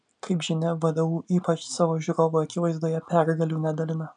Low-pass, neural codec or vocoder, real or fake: 9.9 kHz; none; real